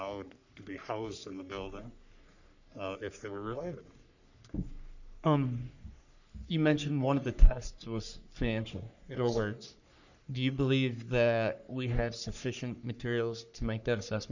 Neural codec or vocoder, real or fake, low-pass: codec, 44.1 kHz, 3.4 kbps, Pupu-Codec; fake; 7.2 kHz